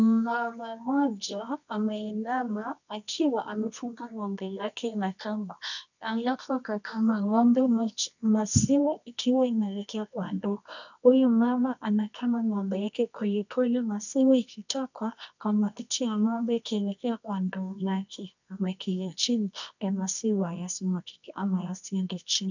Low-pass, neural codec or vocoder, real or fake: 7.2 kHz; codec, 24 kHz, 0.9 kbps, WavTokenizer, medium music audio release; fake